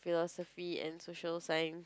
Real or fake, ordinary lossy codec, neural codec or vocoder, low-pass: real; none; none; none